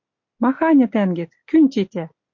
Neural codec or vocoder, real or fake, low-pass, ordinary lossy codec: none; real; 7.2 kHz; MP3, 48 kbps